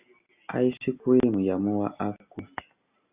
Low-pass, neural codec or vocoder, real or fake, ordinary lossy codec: 3.6 kHz; none; real; Opus, 64 kbps